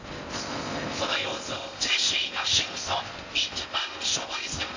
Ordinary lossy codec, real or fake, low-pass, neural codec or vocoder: AAC, 48 kbps; fake; 7.2 kHz; codec, 16 kHz in and 24 kHz out, 0.6 kbps, FocalCodec, streaming, 4096 codes